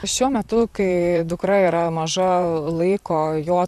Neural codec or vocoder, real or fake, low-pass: vocoder, 44.1 kHz, 128 mel bands, Pupu-Vocoder; fake; 14.4 kHz